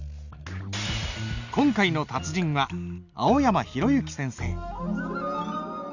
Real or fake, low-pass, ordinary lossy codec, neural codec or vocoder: real; 7.2 kHz; none; none